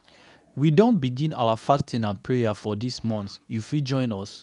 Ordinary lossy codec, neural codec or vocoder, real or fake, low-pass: none; codec, 24 kHz, 0.9 kbps, WavTokenizer, medium speech release version 2; fake; 10.8 kHz